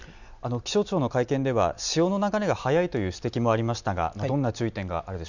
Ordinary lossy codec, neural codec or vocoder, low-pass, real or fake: none; none; 7.2 kHz; real